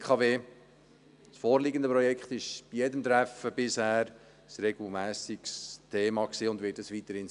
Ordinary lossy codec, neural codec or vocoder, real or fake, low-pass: none; none; real; 10.8 kHz